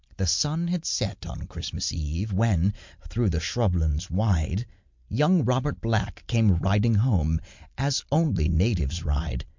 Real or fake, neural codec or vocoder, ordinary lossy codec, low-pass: real; none; MP3, 64 kbps; 7.2 kHz